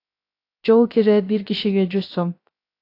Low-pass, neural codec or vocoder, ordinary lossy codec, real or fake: 5.4 kHz; codec, 16 kHz, 0.3 kbps, FocalCodec; AAC, 32 kbps; fake